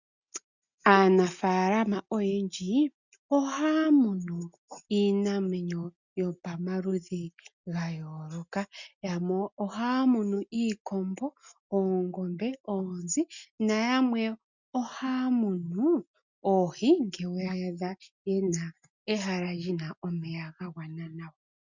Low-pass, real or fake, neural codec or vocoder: 7.2 kHz; real; none